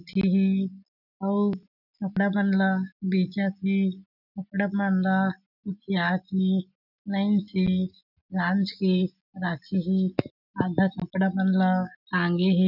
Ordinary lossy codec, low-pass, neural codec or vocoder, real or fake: none; 5.4 kHz; none; real